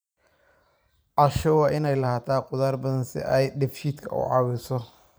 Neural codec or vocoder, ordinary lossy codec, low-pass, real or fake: vocoder, 44.1 kHz, 128 mel bands every 512 samples, BigVGAN v2; none; none; fake